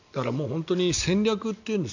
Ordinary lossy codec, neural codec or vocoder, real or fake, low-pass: none; none; real; 7.2 kHz